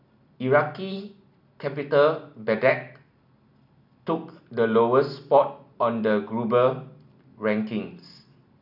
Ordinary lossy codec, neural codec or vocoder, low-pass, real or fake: none; none; 5.4 kHz; real